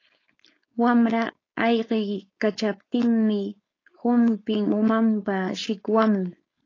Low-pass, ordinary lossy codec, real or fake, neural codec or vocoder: 7.2 kHz; AAC, 32 kbps; fake; codec, 16 kHz, 4.8 kbps, FACodec